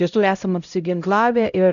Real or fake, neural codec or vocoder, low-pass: fake; codec, 16 kHz, 0.5 kbps, X-Codec, HuBERT features, trained on LibriSpeech; 7.2 kHz